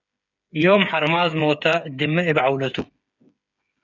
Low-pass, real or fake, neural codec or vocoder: 7.2 kHz; fake; codec, 16 kHz, 8 kbps, FreqCodec, smaller model